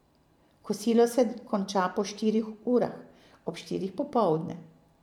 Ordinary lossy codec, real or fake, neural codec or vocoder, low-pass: none; fake; vocoder, 44.1 kHz, 128 mel bands every 512 samples, BigVGAN v2; 19.8 kHz